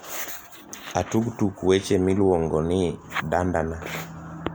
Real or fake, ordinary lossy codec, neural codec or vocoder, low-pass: real; none; none; none